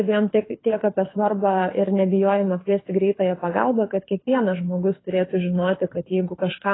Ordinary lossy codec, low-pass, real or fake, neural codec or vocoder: AAC, 16 kbps; 7.2 kHz; fake; codec, 24 kHz, 6 kbps, HILCodec